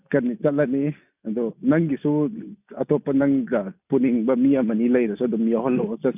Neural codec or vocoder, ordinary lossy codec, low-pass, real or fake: none; none; 3.6 kHz; real